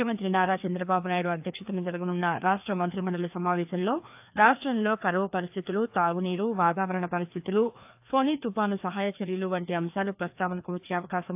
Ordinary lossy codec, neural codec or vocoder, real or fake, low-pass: AAC, 32 kbps; codec, 16 kHz, 2 kbps, FreqCodec, larger model; fake; 3.6 kHz